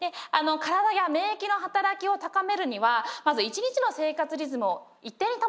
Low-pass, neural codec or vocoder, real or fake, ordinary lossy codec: none; none; real; none